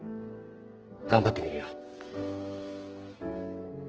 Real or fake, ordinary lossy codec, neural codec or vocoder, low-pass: fake; Opus, 16 kbps; codec, 44.1 kHz, 2.6 kbps, SNAC; 7.2 kHz